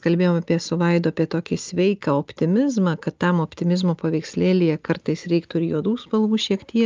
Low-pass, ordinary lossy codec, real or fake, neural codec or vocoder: 7.2 kHz; Opus, 24 kbps; real; none